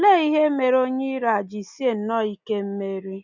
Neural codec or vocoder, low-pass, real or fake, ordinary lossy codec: none; 7.2 kHz; real; none